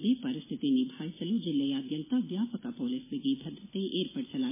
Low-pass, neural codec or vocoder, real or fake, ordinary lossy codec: 3.6 kHz; none; real; MP3, 16 kbps